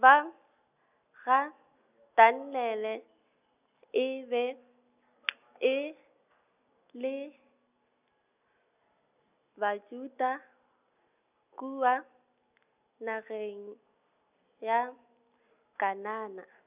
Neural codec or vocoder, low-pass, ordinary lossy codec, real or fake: none; 3.6 kHz; none; real